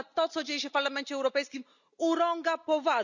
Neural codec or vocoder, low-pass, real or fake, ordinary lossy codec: none; 7.2 kHz; real; none